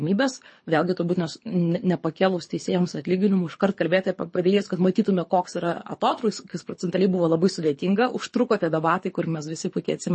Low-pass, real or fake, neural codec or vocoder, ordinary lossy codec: 9.9 kHz; fake; codec, 24 kHz, 6 kbps, HILCodec; MP3, 32 kbps